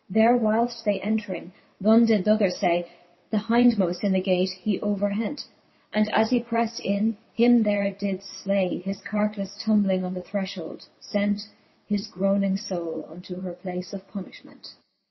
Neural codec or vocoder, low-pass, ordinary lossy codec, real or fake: vocoder, 22.05 kHz, 80 mel bands, WaveNeXt; 7.2 kHz; MP3, 24 kbps; fake